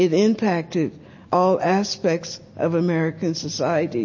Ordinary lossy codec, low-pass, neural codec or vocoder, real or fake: MP3, 32 kbps; 7.2 kHz; none; real